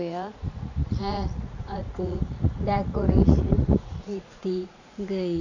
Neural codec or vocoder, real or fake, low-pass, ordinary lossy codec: vocoder, 44.1 kHz, 80 mel bands, Vocos; fake; 7.2 kHz; none